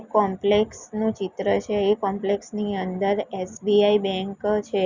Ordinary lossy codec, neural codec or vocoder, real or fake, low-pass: Opus, 64 kbps; none; real; 7.2 kHz